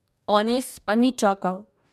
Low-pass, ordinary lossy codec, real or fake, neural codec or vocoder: 14.4 kHz; MP3, 96 kbps; fake; codec, 44.1 kHz, 2.6 kbps, DAC